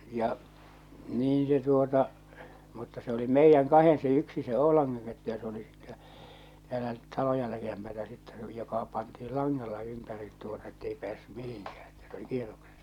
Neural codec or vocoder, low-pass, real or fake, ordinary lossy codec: none; 19.8 kHz; real; none